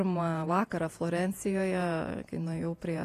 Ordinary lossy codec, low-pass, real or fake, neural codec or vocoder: AAC, 48 kbps; 14.4 kHz; fake; vocoder, 48 kHz, 128 mel bands, Vocos